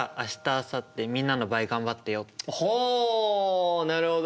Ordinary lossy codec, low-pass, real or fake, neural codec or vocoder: none; none; real; none